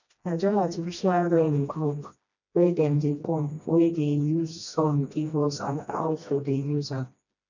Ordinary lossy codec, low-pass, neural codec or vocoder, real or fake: none; 7.2 kHz; codec, 16 kHz, 1 kbps, FreqCodec, smaller model; fake